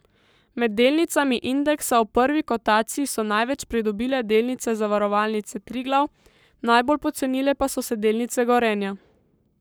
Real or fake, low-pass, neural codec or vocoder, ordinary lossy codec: fake; none; codec, 44.1 kHz, 7.8 kbps, Pupu-Codec; none